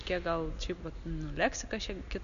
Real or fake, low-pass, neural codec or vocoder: real; 7.2 kHz; none